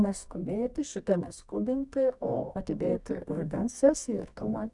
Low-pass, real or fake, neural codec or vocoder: 10.8 kHz; fake; codec, 24 kHz, 0.9 kbps, WavTokenizer, medium music audio release